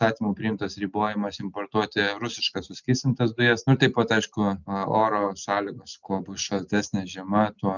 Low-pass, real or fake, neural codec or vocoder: 7.2 kHz; real; none